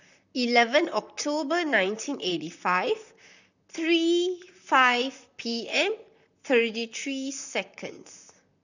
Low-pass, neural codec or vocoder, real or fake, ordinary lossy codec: 7.2 kHz; vocoder, 44.1 kHz, 128 mel bands, Pupu-Vocoder; fake; none